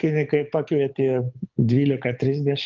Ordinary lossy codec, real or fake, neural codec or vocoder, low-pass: Opus, 24 kbps; fake; codec, 24 kHz, 6 kbps, HILCodec; 7.2 kHz